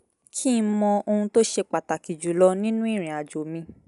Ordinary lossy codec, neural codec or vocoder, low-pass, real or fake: none; none; 10.8 kHz; real